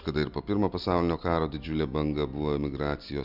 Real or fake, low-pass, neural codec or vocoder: real; 5.4 kHz; none